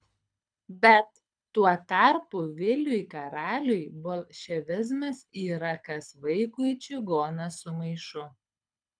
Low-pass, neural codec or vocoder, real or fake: 9.9 kHz; codec, 24 kHz, 6 kbps, HILCodec; fake